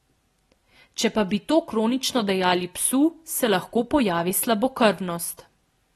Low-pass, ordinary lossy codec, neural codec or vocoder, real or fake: 14.4 kHz; AAC, 32 kbps; none; real